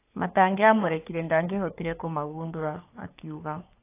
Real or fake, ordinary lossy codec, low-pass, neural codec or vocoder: fake; AAC, 24 kbps; 3.6 kHz; codec, 44.1 kHz, 3.4 kbps, Pupu-Codec